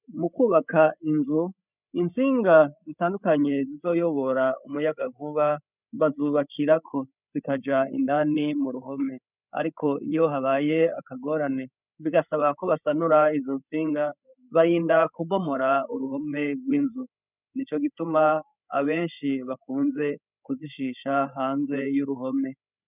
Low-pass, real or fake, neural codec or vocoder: 3.6 kHz; fake; codec, 16 kHz, 8 kbps, FreqCodec, larger model